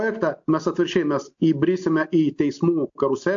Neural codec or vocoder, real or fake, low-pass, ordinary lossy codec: none; real; 7.2 kHz; AAC, 64 kbps